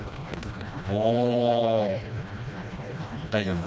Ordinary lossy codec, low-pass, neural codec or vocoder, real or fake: none; none; codec, 16 kHz, 1 kbps, FreqCodec, smaller model; fake